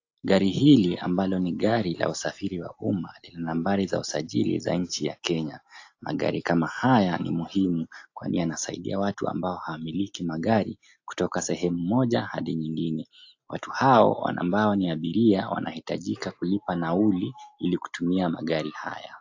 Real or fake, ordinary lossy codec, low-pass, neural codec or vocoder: real; AAC, 48 kbps; 7.2 kHz; none